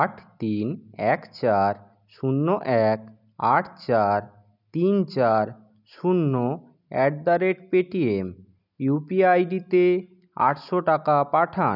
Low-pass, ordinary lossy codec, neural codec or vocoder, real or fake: 5.4 kHz; none; none; real